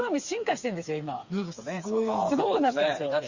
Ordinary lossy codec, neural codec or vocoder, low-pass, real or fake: Opus, 64 kbps; codec, 16 kHz, 4 kbps, FreqCodec, smaller model; 7.2 kHz; fake